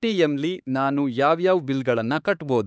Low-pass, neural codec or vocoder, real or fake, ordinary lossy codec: none; codec, 16 kHz, 4 kbps, X-Codec, HuBERT features, trained on LibriSpeech; fake; none